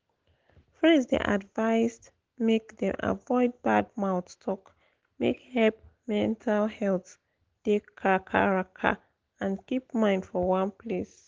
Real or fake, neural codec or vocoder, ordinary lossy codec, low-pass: real; none; Opus, 16 kbps; 7.2 kHz